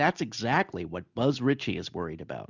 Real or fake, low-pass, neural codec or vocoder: real; 7.2 kHz; none